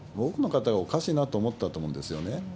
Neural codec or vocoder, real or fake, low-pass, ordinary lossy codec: none; real; none; none